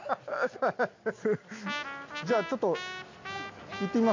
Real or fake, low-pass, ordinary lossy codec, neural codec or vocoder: real; 7.2 kHz; MP3, 48 kbps; none